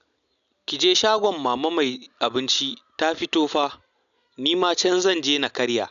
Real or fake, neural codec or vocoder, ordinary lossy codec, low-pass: real; none; none; 7.2 kHz